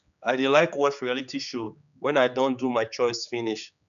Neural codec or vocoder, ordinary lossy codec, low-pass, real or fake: codec, 16 kHz, 4 kbps, X-Codec, HuBERT features, trained on general audio; none; 7.2 kHz; fake